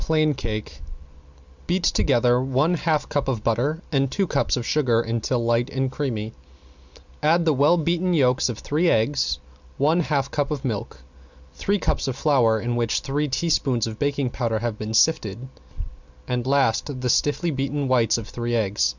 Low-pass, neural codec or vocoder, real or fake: 7.2 kHz; none; real